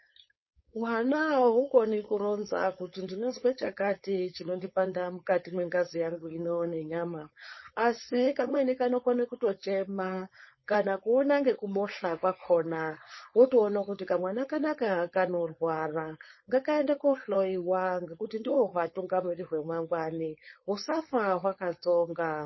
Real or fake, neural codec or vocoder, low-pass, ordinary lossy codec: fake; codec, 16 kHz, 4.8 kbps, FACodec; 7.2 kHz; MP3, 24 kbps